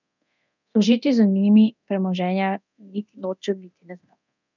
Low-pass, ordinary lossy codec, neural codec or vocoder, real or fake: 7.2 kHz; none; codec, 24 kHz, 0.9 kbps, DualCodec; fake